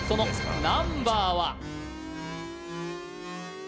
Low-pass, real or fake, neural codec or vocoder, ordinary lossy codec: none; real; none; none